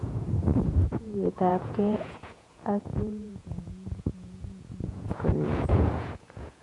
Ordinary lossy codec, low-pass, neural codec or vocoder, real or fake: none; 10.8 kHz; vocoder, 48 kHz, 128 mel bands, Vocos; fake